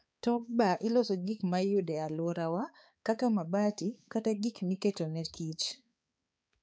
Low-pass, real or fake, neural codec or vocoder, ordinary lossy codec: none; fake; codec, 16 kHz, 4 kbps, X-Codec, HuBERT features, trained on balanced general audio; none